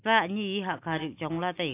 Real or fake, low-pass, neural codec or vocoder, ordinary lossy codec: real; 3.6 kHz; none; AAC, 24 kbps